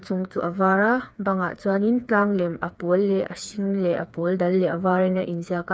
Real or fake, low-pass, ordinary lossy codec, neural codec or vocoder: fake; none; none; codec, 16 kHz, 4 kbps, FreqCodec, smaller model